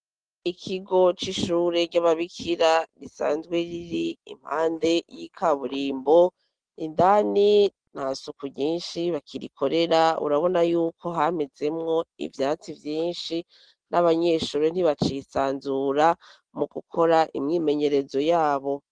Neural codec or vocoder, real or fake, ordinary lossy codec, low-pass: none; real; Opus, 16 kbps; 9.9 kHz